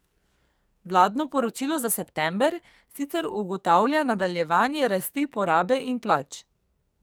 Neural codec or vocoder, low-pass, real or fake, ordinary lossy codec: codec, 44.1 kHz, 2.6 kbps, SNAC; none; fake; none